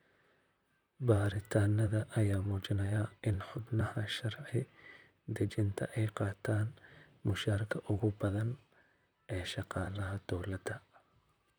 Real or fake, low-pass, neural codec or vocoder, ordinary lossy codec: fake; none; vocoder, 44.1 kHz, 128 mel bands, Pupu-Vocoder; none